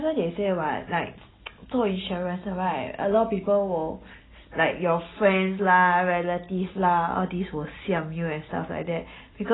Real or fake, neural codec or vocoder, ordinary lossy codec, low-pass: real; none; AAC, 16 kbps; 7.2 kHz